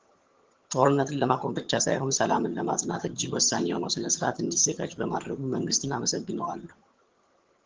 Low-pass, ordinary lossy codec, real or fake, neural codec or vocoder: 7.2 kHz; Opus, 16 kbps; fake; vocoder, 22.05 kHz, 80 mel bands, HiFi-GAN